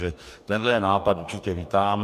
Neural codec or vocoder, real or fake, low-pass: codec, 44.1 kHz, 2.6 kbps, DAC; fake; 14.4 kHz